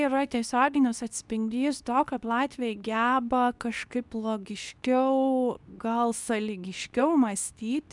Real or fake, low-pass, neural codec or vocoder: fake; 10.8 kHz; codec, 24 kHz, 0.9 kbps, WavTokenizer, medium speech release version 2